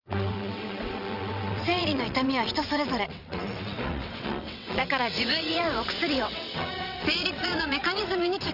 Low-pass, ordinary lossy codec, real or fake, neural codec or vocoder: 5.4 kHz; none; fake; vocoder, 22.05 kHz, 80 mel bands, WaveNeXt